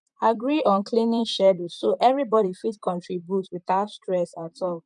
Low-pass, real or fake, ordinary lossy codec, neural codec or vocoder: 10.8 kHz; fake; none; vocoder, 44.1 kHz, 128 mel bands, Pupu-Vocoder